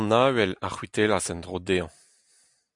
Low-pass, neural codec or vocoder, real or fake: 10.8 kHz; none; real